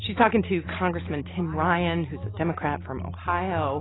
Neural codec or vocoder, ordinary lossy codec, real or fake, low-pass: none; AAC, 16 kbps; real; 7.2 kHz